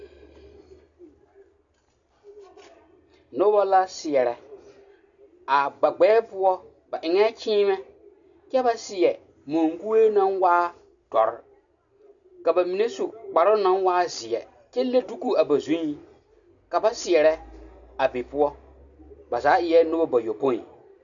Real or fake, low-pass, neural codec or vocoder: real; 7.2 kHz; none